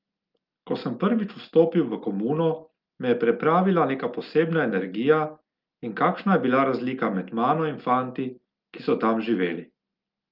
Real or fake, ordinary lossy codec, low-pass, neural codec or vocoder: real; Opus, 32 kbps; 5.4 kHz; none